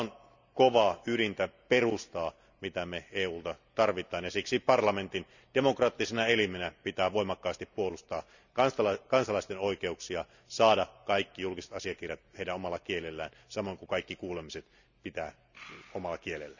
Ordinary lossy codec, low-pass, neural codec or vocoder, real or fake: none; 7.2 kHz; none; real